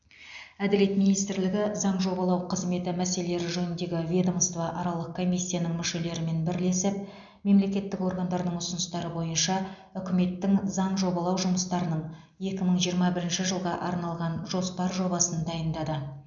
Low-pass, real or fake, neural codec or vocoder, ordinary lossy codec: 7.2 kHz; real; none; none